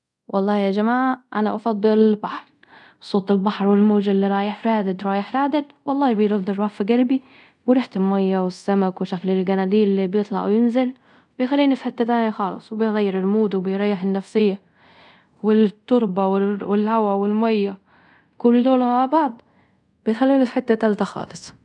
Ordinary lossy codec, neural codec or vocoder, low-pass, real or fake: none; codec, 24 kHz, 0.5 kbps, DualCodec; 10.8 kHz; fake